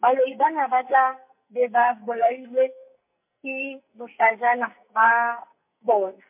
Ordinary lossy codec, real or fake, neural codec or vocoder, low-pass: MP3, 32 kbps; fake; codec, 44.1 kHz, 2.6 kbps, SNAC; 3.6 kHz